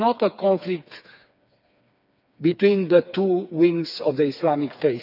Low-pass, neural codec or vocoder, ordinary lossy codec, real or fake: 5.4 kHz; codec, 16 kHz, 4 kbps, FreqCodec, smaller model; none; fake